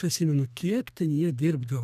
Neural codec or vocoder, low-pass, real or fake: codec, 32 kHz, 1.9 kbps, SNAC; 14.4 kHz; fake